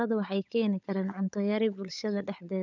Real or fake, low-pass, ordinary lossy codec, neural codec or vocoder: fake; 7.2 kHz; none; codec, 16 kHz, 16 kbps, FunCodec, trained on LibriTTS, 50 frames a second